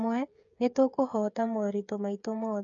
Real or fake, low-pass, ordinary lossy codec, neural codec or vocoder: fake; 7.2 kHz; none; codec, 16 kHz, 8 kbps, FreqCodec, smaller model